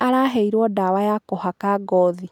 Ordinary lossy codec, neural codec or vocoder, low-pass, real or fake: none; none; 19.8 kHz; real